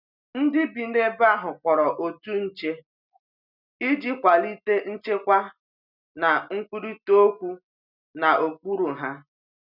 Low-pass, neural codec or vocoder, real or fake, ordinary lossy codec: 5.4 kHz; none; real; none